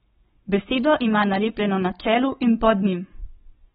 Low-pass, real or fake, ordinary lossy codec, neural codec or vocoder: 19.8 kHz; fake; AAC, 16 kbps; codec, 44.1 kHz, 7.8 kbps, Pupu-Codec